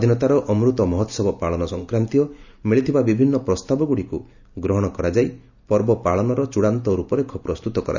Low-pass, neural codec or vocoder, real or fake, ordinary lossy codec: 7.2 kHz; none; real; none